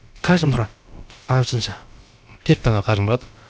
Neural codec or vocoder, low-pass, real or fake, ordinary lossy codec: codec, 16 kHz, about 1 kbps, DyCAST, with the encoder's durations; none; fake; none